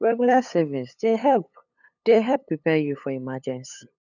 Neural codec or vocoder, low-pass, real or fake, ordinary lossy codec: codec, 16 kHz, 8 kbps, FunCodec, trained on LibriTTS, 25 frames a second; 7.2 kHz; fake; none